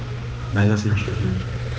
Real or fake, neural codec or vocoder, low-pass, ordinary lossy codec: fake; codec, 16 kHz, 2 kbps, X-Codec, HuBERT features, trained on balanced general audio; none; none